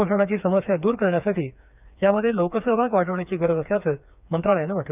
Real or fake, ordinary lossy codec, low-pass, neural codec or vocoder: fake; none; 3.6 kHz; codec, 16 kHz, 4 kbps, FreqCodec, smaller model